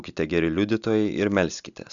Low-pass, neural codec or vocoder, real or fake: 7.2 kHz; none; real